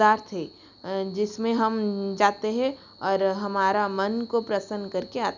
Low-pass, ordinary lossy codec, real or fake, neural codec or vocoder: 7.2 kHz; none; real; none